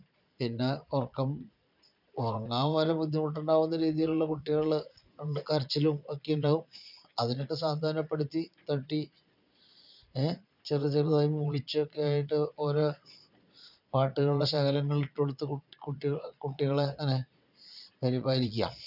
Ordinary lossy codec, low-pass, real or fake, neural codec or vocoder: none; 5.4 kHz; fake; vocoder, 22.05 kHz, 80 mel bands, Vocos